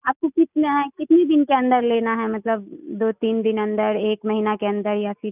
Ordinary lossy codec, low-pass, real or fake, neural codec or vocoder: none; 3.6 kHz; real; none